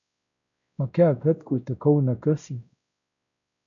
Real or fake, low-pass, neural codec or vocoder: fake; 7.2 kHz; codec, 16 kHz, 0.5 kbps, X-Codec, WavLM features, trained on Multilingual LibriSpeech